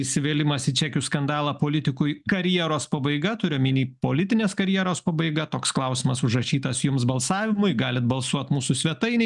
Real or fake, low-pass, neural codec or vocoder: real; 10.8 kHz; none